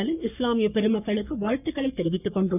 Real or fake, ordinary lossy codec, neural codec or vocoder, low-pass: fake; none; codec, 44.1 kHz, 3.4 kbps, Pupu-Codec; 3.6 kHz